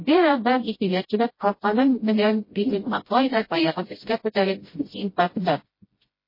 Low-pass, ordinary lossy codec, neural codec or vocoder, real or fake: 5.4 kHz; MP3, 24 kbps; codec, 16 kHz, 0.5 kbps, FreqCodec, smaller model; fake